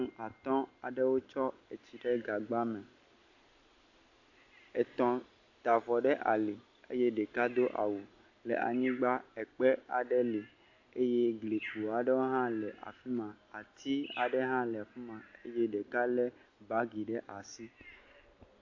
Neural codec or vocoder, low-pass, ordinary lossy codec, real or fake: none; 7.2 kHz; AAC, 48 kbps; real